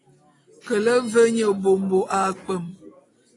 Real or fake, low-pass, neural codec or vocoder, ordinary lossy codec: real; 10.8 kHz; none; AAC, 32 kbps